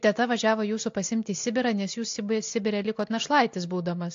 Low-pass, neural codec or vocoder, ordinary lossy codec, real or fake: 7.2 kHz; none; AAC, 48 kbps; real